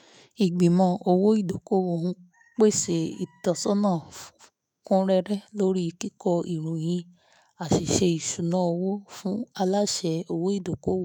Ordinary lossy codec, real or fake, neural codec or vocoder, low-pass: none; fake; autoencoder, 48 kHz, 128 numbers a frame, DAC-VAE, trained on Japanese speech; none